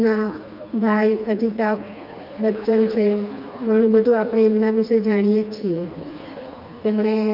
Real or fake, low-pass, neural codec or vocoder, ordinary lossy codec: fake; 5.4 kHz; codec, 16 kHz, 2 kbps, FreqCodec, smaller model; none